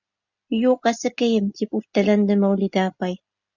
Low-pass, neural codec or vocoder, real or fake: 7.2 kHz; none; real